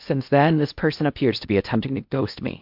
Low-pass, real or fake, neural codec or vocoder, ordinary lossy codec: 5.4 kHz; fake; codec, 16 kHz in and 24 kHz out, 0.8 kbps, FocalCodec, streaming, 65536 codes; MP3, 48 kbps